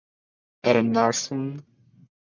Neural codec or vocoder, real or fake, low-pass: codec, 44.1 kHz, 1.7 kbps, Pupu-Codec; fake; 7.2 kHz